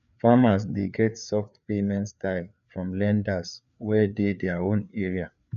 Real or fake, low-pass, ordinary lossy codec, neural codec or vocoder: fake; 7.2 kHz; none; codec, 16 kHz, 4 kbps, FreqCodec, larger model